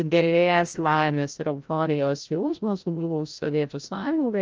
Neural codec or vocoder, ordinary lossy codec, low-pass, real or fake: codec, 16 kHz, 0.5 kbps, FreqCodec, larger model; Opus, 24 kbps; 7.2 kHz; fake